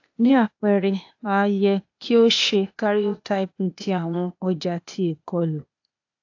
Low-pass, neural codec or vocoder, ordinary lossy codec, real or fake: 7.2 kHz; codec, 16 kHz, 0.8 kbps, ZipCodec; AAC, 48 kbps; fake